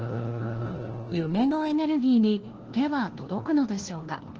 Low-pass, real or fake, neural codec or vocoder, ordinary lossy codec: 7.2 kHz; fake; codec, 16 kHz, 1 kbps, FunCodec, trained on LibriTTS, 50 frames a second; Opus, 16 kbps